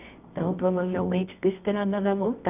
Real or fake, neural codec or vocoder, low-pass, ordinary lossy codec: fake; codec, 24 kHz, 0.9 kbps, WavTokenizer, medium music audio release; 3.6 kHz; none